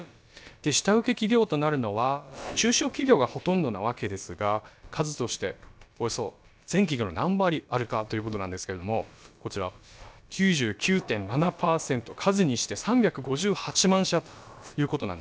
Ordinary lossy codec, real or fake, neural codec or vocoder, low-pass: none; fake; codec, 16 kHz, about 1 kbps, DyCAST, with the encoder's durations; none